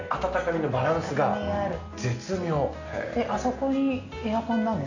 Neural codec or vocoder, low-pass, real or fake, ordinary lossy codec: none; 7.2 kHz; real; none